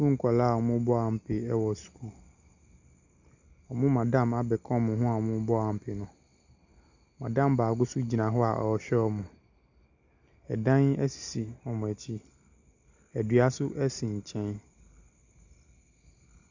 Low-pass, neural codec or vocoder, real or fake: 7.2 kHz; none; real